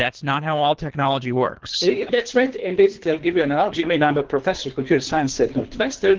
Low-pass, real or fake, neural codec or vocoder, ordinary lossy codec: 7.2 kHz; fake; codec, 24 kHz, 3 kbps, HILCodec; Opus, 16 kbps